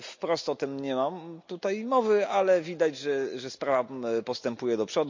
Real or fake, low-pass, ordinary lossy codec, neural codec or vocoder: real; 7.2 kHz; MP3, 64 kbps; none